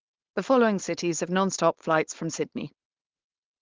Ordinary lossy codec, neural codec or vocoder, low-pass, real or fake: Opus, 16 kbps; codec, 16 kHz, 4.8 kbps, FACodec; 7.2 kHz; fake